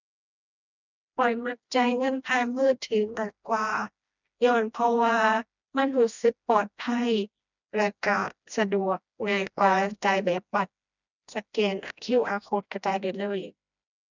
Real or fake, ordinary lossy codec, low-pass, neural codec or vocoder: fake; none; 7.2 kHz; codec, 16 kHz, 1 kbps, FreqCodec, smaller model